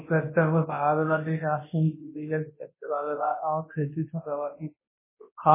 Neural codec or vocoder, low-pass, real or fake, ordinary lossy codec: codec, 24 kHz, 0.9 kbps, WavTokenizer, large speech release; 3.6 kHz; fake; MP3, 16 kbps